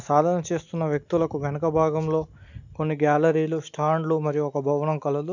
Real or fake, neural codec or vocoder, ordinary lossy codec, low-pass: real; none; none; 7.2 kHz